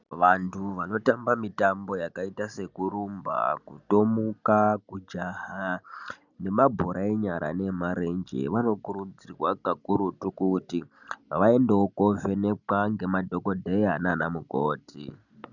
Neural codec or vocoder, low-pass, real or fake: none; 7.2 kHz; real